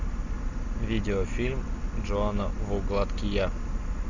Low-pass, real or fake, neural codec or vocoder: 7.2 kHz; real; none